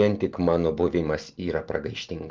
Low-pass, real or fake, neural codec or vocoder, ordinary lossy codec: 7.2 kHz; real; none; Opus, 24 kbps